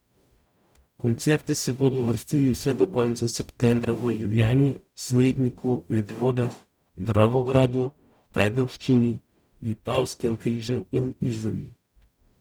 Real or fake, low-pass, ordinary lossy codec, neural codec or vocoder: fake; none; none; codec, 44.1 kHz, 0.9 kbps, DAC